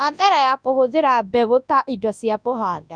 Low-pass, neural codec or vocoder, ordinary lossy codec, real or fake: 9.9 kHz; codec, 24 kHz, 0.9 kbps, WavTokenizer, large speech release; none; fake